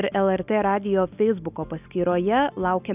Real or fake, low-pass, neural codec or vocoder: real; 3.6 kHz; none